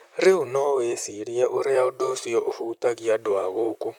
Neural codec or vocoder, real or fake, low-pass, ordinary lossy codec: vocoder, 44.1 kHz, 128 mel bands, Pupu-Vocoder; fake; 19.8 kHz; none